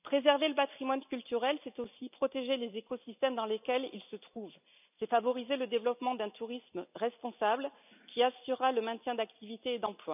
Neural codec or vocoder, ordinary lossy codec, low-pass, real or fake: none; none; 3.6 kHz; real